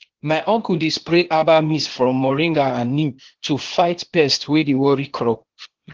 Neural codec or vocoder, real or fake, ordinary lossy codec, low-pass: codec, 16 kHz, 0.8 kbps, ZipCodec; fake; Opus, 16 kbps; 7.2 kHz